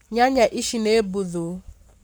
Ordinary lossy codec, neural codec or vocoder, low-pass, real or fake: none; codec, 44.1 kHz, 7.8 kbps, Pupu-Codec; none; fake